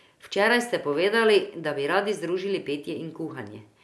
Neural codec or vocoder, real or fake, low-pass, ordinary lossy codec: none; real; none; none